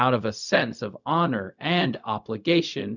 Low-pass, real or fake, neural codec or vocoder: 7.2 kHz; fake; codec, 16 kHz, 0.4 kbps, LongCat-Audio-Codec